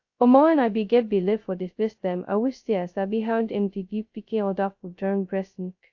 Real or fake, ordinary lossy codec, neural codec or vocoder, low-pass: fake; AAC, 48 kbps; codec, 16 kHz, 0.2 kbps, FocalCodec; 7.2 kHz